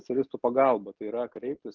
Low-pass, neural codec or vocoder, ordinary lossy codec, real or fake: 7.2 kHz; none; Opus, 24 kbps; real